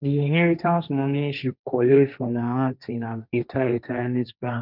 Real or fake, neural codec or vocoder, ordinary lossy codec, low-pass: fake; codec, 16 kHz, 1.1 kbps, Voila-Tokenizer; none; 5.4 kHz